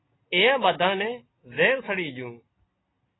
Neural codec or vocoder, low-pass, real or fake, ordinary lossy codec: none; 7.2 kHz; real; AAC, 16 kbps